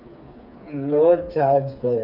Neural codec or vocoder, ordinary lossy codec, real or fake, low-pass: codec, 16 kHz, 4 kbps, FreqCodec, smaller model; none; fake; 5.4 kHz